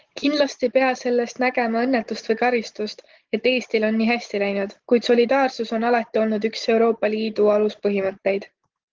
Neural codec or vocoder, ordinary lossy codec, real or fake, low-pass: none; Opus, 16 kbps; real; 7.2 kHz